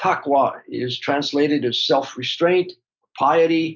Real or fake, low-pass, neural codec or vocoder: real; 7.2 kHz; none